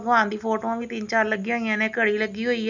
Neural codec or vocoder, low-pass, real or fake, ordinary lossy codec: none; 7.2 kHz; real; none